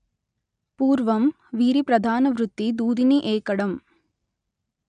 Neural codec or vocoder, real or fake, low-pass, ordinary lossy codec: none; real; 9.9 kHz; none